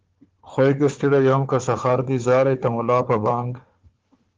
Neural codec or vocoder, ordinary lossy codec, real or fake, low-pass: codec, 16 kHz, 4 kbps, FunCodec, trained on Chinese and English, 50 frames a second; Opus, 16 kbps; fake; 7.2 kHz